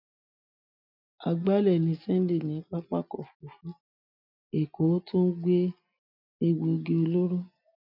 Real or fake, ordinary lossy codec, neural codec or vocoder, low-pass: real; none; none; 5.4 kHz